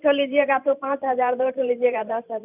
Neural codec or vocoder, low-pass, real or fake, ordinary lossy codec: vocoder, 44.1 kHz, 128 mel bands every 512 samples, BigVGAN v2; 3.6 kHz; fake; none